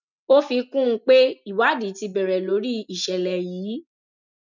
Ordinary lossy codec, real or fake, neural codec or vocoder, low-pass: none; real; none; 7.2 kHz